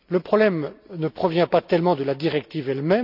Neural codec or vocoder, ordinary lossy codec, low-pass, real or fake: none; none; 5.4 kHz; real